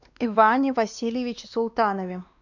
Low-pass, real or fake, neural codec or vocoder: 7.2 kHz; fake; codec, 16 kHz, 2 kbps, X-Codec, WavLM features, trained on Multilingual LibriSpeech